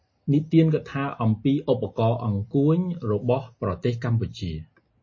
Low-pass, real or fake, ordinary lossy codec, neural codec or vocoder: 7.2 kHz; real; MP3, 32 kbps; none